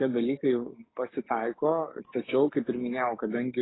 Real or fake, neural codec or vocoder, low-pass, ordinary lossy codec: fake; codec, 16 kHz, 6 kbps, DAC; 7.2 kHz; AAC, 16 kbps